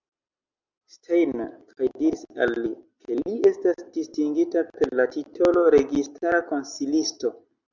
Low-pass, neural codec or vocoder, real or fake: 7.2 kHz; none; real